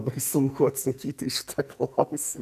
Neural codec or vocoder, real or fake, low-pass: codec, 44.1 kHz, 2.6 kbps, SNAC; fake; 14.4 kHz